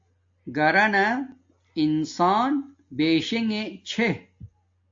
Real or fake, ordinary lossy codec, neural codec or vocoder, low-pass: real; AAC, 48 kbps; none; 7.2 kHz